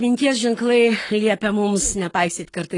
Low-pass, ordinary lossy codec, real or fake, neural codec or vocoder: 10.8 kHz; AAC, 32 kbps; fake; codec, 44.1 kHz, 7.8 kbps, Pupu-Codec